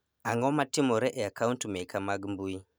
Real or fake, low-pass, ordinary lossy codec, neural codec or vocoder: real; none; none; none